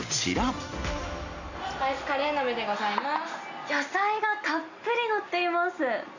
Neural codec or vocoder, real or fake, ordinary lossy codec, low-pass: none; real; none; 7.2 kHz